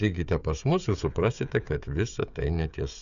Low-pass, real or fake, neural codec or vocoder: 7.2 kHz; fake; codec, 16 kHz, 16 kbps, FreqCodec, smaller model